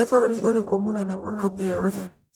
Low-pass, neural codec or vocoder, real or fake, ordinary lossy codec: none; codec, 44.1 kHz, 0.9 kbps, DAC; fake; none